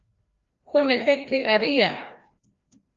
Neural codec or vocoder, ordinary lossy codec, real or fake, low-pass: codec, 16 kHz, 1 kbps, FreqCodec, larger model; Opus, 32 kbps; fake; 7.2 kHz